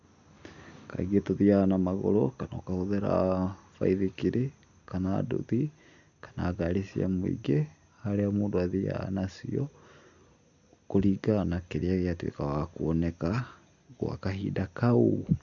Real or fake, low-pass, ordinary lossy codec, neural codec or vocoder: real; 7.2 kHz; none; none